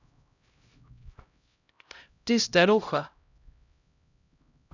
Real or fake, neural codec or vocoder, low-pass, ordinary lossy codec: fake; codec, 16 kHz, 0.5 kbps, X-Codec, HuBERT features, trained on LibriSpeech; 7.2 kHz; none